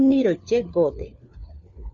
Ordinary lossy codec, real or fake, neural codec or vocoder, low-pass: Opus, 64 kbps; fake; codec, 16 kHz, 4 kbps, FunCodec, trained on LibriTTS, 50 frames a second; 7.2 kHz